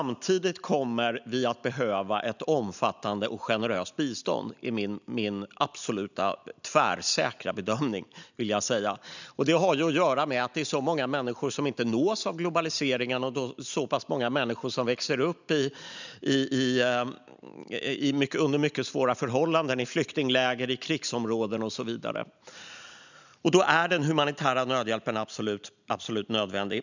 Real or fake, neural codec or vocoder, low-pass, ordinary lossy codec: real; none; 7.2 kHz; none